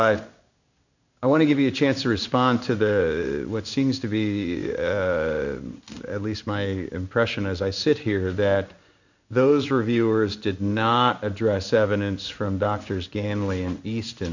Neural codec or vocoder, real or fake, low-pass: codec, 16 kHz in and 24 kHz out, 1 kbps, XY-Tokenizer; fake; 7.2 kHz